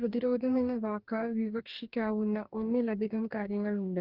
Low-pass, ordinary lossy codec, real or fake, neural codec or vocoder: 5.4 kHz; Opus, 24 kbps; fake; codec, 44.1 kHz, 2.6 kbps, DAC